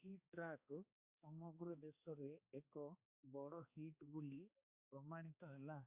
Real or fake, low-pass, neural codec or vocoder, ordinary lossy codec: fake; 3.6 kHz; codec, 16 kHz, 2 kbps, X-Codec, HuBERT features, trained on balanced general audio; Opus, 64 kbps